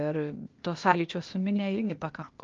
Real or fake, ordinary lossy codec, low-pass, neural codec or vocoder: fake; Opus, 24 kbps; 7.2 kHz; codec, 16 kHz, 0.8 kbps, ZipCodec